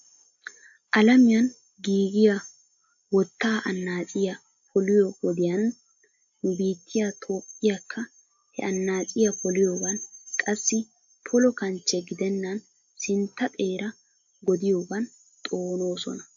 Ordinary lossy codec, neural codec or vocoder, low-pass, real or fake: AAC, 64 kbps; none; 7.2 kHz; real